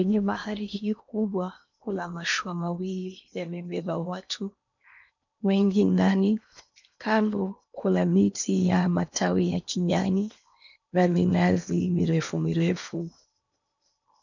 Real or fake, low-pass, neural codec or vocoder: fake; 7.2 kHz; codec, 16 kHz in and 24 kHz out, 0.8 kbps, FocalCodec, streaming, 65536 codes